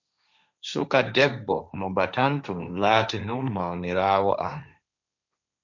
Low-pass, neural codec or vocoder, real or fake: 7.2 kHz; codec, 16 kHz, 1.1 kbps, Voila-Tokenizer; fake